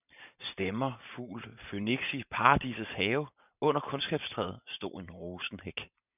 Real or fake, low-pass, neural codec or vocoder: fake; 3.6 kHz; vocoder, 44.1 kHz, 128 mel bands every 512 samples, BigVGAN v2